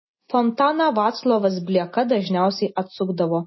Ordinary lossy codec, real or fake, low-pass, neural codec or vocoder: MP3, 24 kbps; real; 7.2 kHz; none